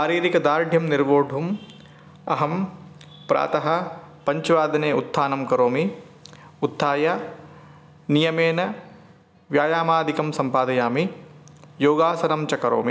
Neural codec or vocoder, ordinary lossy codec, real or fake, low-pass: none; none; real; none